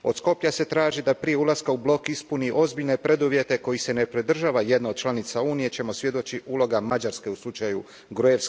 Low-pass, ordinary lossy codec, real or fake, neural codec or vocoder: none; none; real; none